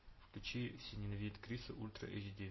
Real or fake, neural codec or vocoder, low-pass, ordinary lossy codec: real; none; 7.2 kHz; MP3, 24 kbps